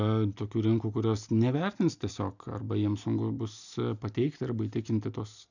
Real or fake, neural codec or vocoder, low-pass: real; none; 7.2 kHz